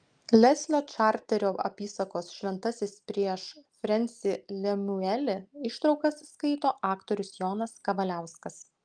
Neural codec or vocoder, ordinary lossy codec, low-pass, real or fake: none; Opus, 32 kbps; 9.9 kHz; real